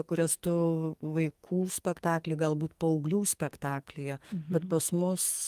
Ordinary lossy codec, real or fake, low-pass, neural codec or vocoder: Opus, 32 kbps; fake; 14.4 kHz; codec, 44.1 kHz, 2.6 kbps, SNAC